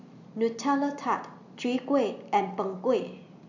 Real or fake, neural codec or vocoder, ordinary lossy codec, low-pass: real; none; none; 7.2 kHz